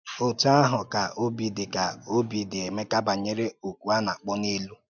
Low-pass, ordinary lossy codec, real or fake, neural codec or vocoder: 7.2 kHz; none; real; none